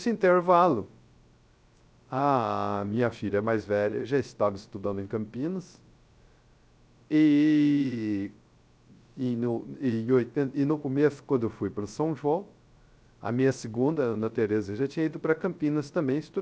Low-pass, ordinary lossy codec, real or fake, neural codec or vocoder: none; none; fake; codec, 16 kHz, 0.3 kbps, FocalCodec